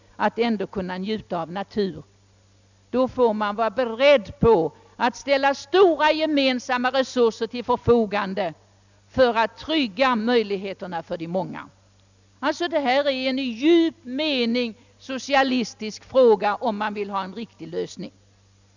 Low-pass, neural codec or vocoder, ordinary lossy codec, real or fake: 7.2 kHz; none; none; real